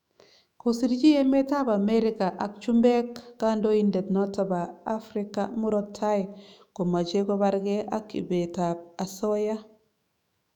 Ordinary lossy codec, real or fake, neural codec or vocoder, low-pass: none; fake; autoencoder, 48 kHz, 128 numbers a frame, DAC-VAE, trained on Japanese speech; 19.8 kHz